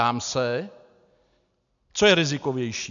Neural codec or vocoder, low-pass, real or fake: none; 7.2 kHz; real